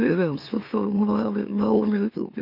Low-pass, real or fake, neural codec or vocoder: 5.4 kHz; fake; autoencoder, 44.1 kHz, a latent of 192 numbers a frame, MeloTTS